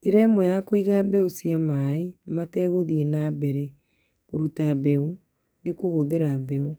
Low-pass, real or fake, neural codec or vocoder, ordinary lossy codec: none; fake; codec, 44.1 kHz, 2.6 kbps, SNAC; none